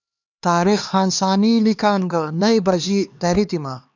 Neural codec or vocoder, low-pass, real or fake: codec, 16 kHz, 2 kbps, X-Codec, HuBERT features, trained on LibriSpeech; 7.2 kHz; fake